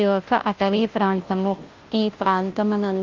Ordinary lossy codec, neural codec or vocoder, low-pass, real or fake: Opus, 32 kbps; codec, 16 kHz, 0.5 kbps, FunCodec, trained on Chinese and English, 25 frames a second; 7.2 kHz; fake